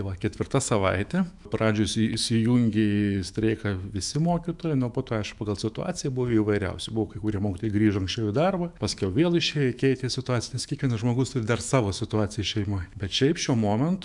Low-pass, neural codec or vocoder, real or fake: 10.8 kHz; autoencoder, 48 kHz, 128 numbers a frame, DAC-VAE, trained on Japanese speech; fake